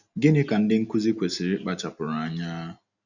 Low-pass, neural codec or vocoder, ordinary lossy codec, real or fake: 7.2 kHz; none; none; real